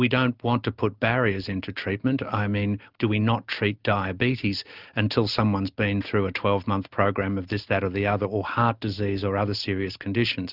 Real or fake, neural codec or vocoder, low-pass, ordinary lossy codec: real; none; 5.4 kHz; Opus, 24 kbps